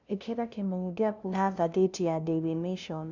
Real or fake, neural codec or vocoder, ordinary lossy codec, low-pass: fake; codec, 16 kHz, 0.5 kbps, FunCodec, trained on LibriTTS, 25 frames a second; none; 7.2 kHz